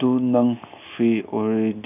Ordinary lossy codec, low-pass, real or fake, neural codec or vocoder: none; 3.6 kHz; real; none